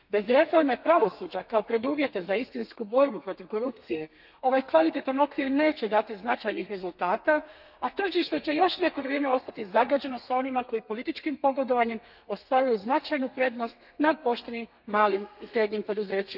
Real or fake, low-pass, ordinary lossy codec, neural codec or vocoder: fake; 5.4 kHz; none; codec, 32 kHz, 1.9 kbps, SNAC